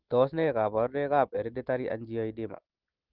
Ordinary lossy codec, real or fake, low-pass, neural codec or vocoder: Opus, 16 kbps; real; 5.4 kHz; none